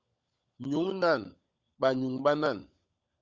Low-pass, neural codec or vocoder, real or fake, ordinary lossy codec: 7.2 kHz; codec, 16 kHz, 16 kbps, FunCodec, trained on LibriTTS, 50 frames a second; fake; Opus, 64 kbps